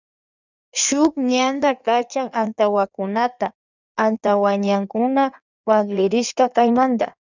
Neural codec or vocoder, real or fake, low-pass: codec, 16 kHz in and 24 kHz out, 1.1 kbps, FireRedTTS-2 codec; fake; 7.2 kHz